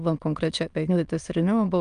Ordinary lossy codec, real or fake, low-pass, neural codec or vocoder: Opus, 32 kbps; fake; 9.9 kHz; autoencoder, 22.05 kHz, a latent of 192 numbers a frame, VITS, trained on many speakers